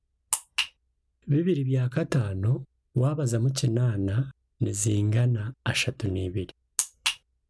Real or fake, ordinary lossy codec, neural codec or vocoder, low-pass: real; none; none; none